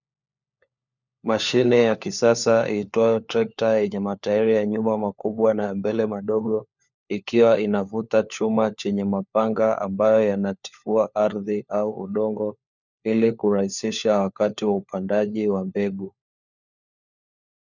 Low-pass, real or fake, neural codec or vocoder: 7.2 kHz; fake; codec, 16 kHz, 4 kbps, FunCodec, trained on LibriTTS, 50 frames a second